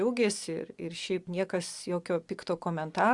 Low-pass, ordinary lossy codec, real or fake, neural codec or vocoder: 10.8 kHz; Opus, 64 kbps; real; none